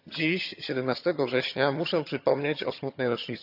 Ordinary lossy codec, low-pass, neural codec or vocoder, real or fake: none; 5.4 kHz; vocoder, 22.05 kHz, 80 mel bands, HiFi-GAN; fake